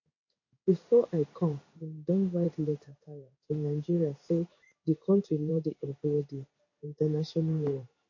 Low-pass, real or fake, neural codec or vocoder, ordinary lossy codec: 7.2 kHz; fake; codec, 16 kHz in and 24 kHz out, 1 kbps, XY-Tokenizer; MP3, 32 kbps